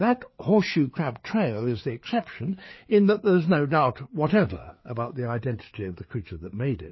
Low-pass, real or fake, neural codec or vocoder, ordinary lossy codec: 7.2 kHz; fake; codec, 16 kHz, 4 kbps, FreqCodec, larger model; MP3, 24 kbps